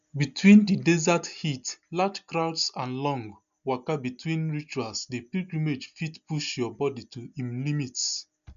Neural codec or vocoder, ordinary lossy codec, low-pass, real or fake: none; none; 7.2 kHz; real